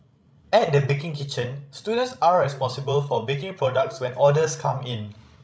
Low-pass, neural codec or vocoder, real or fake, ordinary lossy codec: none; codec, 16 kHz, 16 kbps, FreqCodec, larger model; fake; none